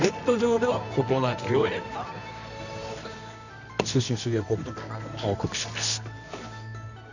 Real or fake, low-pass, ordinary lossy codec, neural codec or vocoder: fake; 7.2 kHz; none; codec, 24 kHz, 0.9 kbps, WavTokenizer, medium music audio release